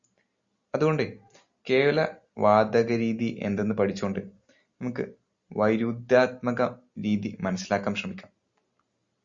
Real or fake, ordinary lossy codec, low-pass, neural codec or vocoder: real; Opus, 64 kbps; 7.2 kHz; none